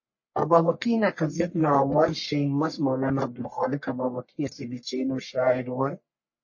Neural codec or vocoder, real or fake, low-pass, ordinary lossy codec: codec, 44.1 kHz, 1.7 kbps, Pupu-Codec; fake; 7.2 kHz; MP3, 32 kbps